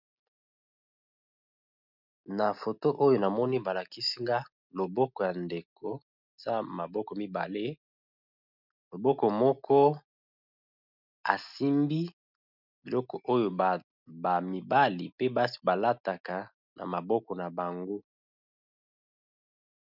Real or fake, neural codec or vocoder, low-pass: real; none; 5.4 kHz